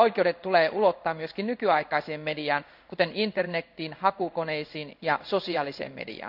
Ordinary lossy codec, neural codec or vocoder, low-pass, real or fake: none; codec, 16 kHz in and 24 kHz out, 1 kbps, XY-Tokenizer; 5.4 kHz; fake